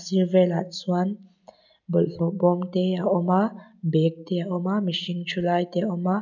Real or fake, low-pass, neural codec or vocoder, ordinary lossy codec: real; 7.2 kHz; none; none